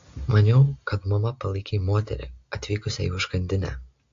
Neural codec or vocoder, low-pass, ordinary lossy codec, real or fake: none; 7.2 kHz; AAC, 48 kbps; real